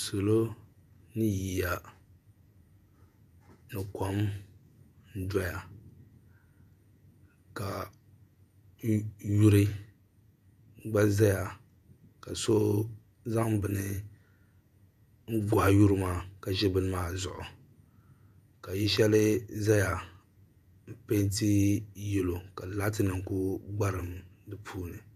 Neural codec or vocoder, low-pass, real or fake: vocoder, 48 kHz, 128 mel bands, Vocos; 14.4 kHz; fake